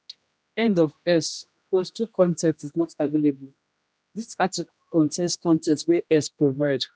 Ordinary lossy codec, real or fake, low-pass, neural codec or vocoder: none; fake; none; codec, 16 kHz, 1 kbps, X-Codec, HuBERT features, trained on general audio